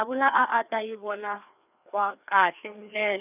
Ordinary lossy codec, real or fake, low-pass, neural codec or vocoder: none; fake; 3.6 kHz; codec, 16 kHz, 2 kbps, FreqCodec, larger model